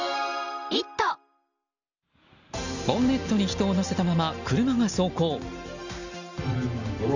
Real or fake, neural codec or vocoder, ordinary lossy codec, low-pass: real; none; none; 7.2 kHz